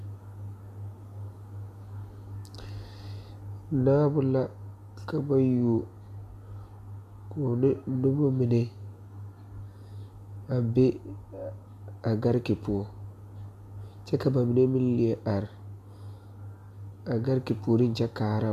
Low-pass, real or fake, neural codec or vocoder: 14.4 kHz; real; none